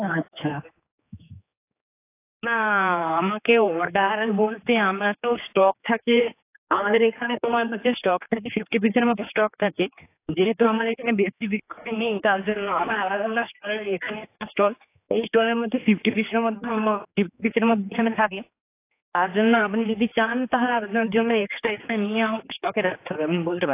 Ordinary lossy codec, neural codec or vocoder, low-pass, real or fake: AAC, 24 kbps; codec, 16 kHz, 4 kbps, X-Codec, HuBERT features, trained on general audio; 3.6 kHz; fake